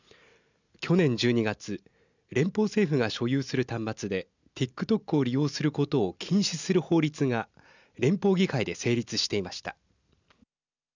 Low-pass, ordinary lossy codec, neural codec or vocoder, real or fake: 7.2 kHz; none; none; real